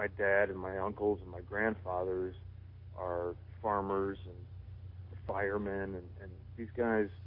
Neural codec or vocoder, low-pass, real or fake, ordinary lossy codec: none; 5.4 kHz; real; MP3, 32 kbps